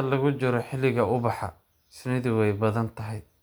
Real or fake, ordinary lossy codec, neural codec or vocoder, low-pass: real; none; none; none